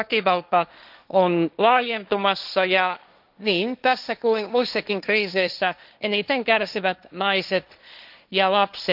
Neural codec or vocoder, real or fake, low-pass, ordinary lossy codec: codec, 16 kHz, 1.1 kbps, Voila-Tokenizer; fake; 5.4 kHz; none